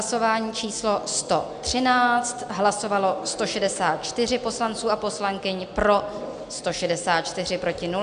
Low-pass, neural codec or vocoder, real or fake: 9.9 kHz; none; real